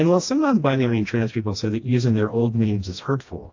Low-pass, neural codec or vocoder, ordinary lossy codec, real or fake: 7.2 kHz; codec, 16 kHz, 1 kbps, FreqCodec, smaller model; AAC, 48 kbps; fake